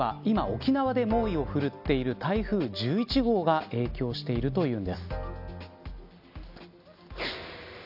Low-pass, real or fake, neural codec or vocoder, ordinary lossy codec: 5.4 kHz; real; none; none